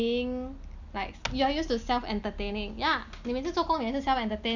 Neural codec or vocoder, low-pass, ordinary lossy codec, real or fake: none; 7.2 kHz; none; real